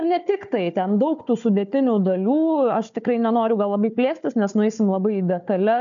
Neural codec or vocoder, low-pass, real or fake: codec, 16 kHz, 4 kbps, FunCodec, trained on Chinese and English, 50 frames a second; 7.2 kHz; fake